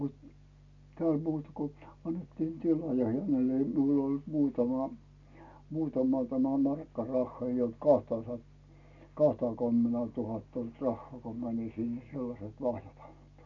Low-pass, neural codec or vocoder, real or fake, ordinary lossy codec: 7.2 kHz; none; real; none